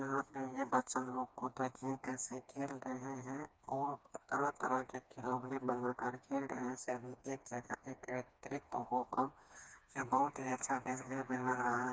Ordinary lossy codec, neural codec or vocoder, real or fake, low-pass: none; codec, 16 kHz, 2 kbps, FreqCodec, smaller model; fake; none